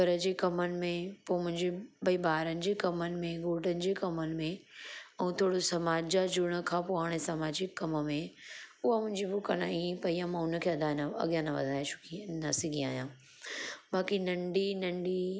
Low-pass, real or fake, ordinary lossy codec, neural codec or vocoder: none; real; none; none